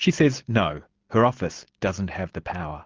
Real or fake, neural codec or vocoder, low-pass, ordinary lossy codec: real; none; 7.2 kHz; Opus, 16 kbps